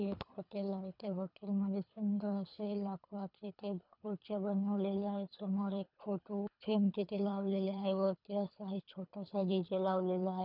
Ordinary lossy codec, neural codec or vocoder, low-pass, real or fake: none; codec, 24 kHz, 3 kbps, HILCodec; 5.4 kHz; fake